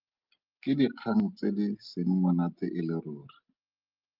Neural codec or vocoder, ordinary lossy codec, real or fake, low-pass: none; Opus, 32 kbps; real; 5.4 kHz